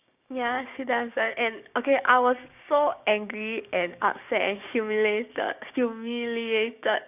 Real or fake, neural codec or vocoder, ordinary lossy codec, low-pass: fake; codec, 16 kHz, 6 kbps, DAC; none; 3.6 kHz